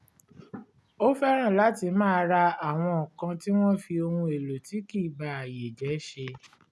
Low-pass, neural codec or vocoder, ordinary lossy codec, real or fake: none; none; none; real